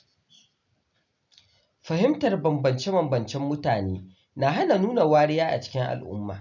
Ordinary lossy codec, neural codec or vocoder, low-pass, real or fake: none; none; 7.2 kHz; real